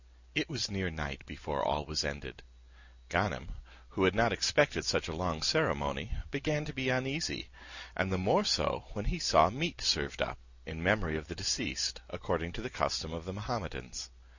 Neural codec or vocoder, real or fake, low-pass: none; real; 7.2 kHz